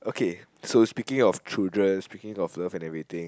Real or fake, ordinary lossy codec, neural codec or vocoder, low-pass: real; none; none; none